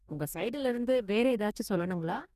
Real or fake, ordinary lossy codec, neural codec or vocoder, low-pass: fake; none; codec, 44.1 kHz, 2.6 kbps, DAC; 14.4 kHz